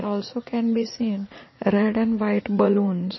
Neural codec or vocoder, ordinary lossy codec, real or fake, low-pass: vocoder, 44.1 kHz, 80 mel bands, Vocos; MP3, 24 kbps; fake; 7.2 kHz